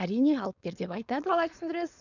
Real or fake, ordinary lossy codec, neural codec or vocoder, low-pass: fake; none; codec, 16 kHz, 4.8 kbps, FACodec; 7.2 kHz